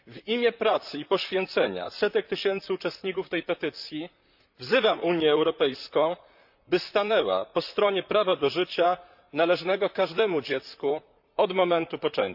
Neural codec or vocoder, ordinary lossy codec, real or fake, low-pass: vocoder, 44.1 kHz, 128 mel bands, Pupu-Vocoder; none; fake; 5.4 kHz